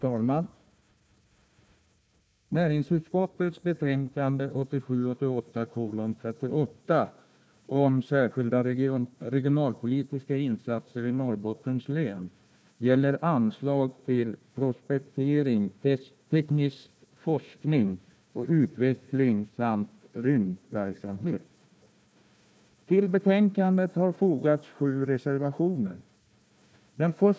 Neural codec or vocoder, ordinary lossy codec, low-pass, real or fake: codec, 16 kHz, 1 kbps, FunCodec, trained on Chinese and English, 50 frames a second; none; none; fake